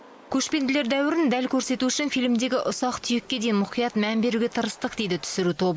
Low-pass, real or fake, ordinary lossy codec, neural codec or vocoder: none; real; none; none